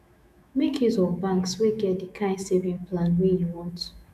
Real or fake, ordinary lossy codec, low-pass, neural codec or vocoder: fake; none; 14.4 kHz; autoencoder, 48 kHz, 128 numbers a frame, DAC-VAE, trained on Japanese speech